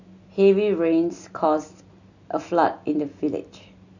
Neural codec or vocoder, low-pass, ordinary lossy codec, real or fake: none; 7.2 kHz; none; real